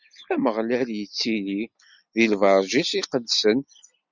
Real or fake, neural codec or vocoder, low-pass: real; none; 7.2 kHz